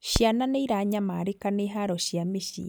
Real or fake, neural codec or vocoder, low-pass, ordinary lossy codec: real; none; none; none